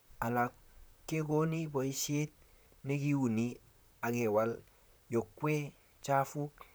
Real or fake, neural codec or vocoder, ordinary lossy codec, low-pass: fake; vocoder, 44.1 kHz, 128 mel bands, Pupu-Vocoder; none; none